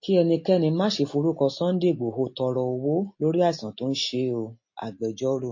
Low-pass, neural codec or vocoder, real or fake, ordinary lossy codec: 7.2 kHz; none; real; MP3, 32 kbps